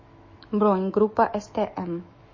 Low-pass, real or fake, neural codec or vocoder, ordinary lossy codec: 7.2 kHz; fake; autoencoder, 48 kHz, 128 numbers a frame, DAC-VAE, trained on Japanese speech; MP3, 32 kbps